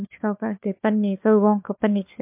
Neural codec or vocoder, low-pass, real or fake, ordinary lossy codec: codec, 16 kHz, 4 kbps, FunCodec, trained on LibriTTS, 50 frames a second; 3.6 kHz; fake; MP3, 32 kbps